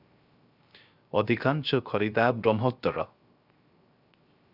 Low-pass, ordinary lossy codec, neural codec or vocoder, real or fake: 5.4 kHz; Opus, 64 kbps; codec, 16 kHz, 0.3 kbps, FocalCodec; fake